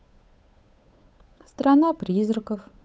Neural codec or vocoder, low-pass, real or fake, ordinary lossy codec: codec, 16 kHz, 8 kbps, FunCodec, trained on Chinese and English, 25 frames a second; none; fake; none